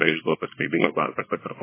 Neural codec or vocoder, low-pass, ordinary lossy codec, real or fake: codec, 24 kHz, 0.9 kbps, WavTokenizer, small release; 3.6 kHz; MP3, 16 kbps; fake